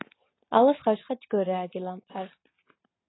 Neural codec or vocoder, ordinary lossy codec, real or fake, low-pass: none; AAC, 16 kbps; real; 7.2 kHz